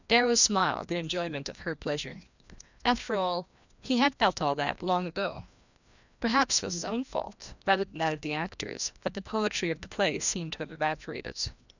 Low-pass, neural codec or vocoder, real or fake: 7.2 kHz; codec, 16 kHz, 1 kbps, FreqCodec, larger model; fake